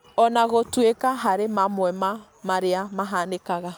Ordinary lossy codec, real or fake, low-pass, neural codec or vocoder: none; real; none; none